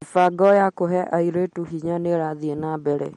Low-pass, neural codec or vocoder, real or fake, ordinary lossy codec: 19.8 kHz; none; real; MP3, 48 kbps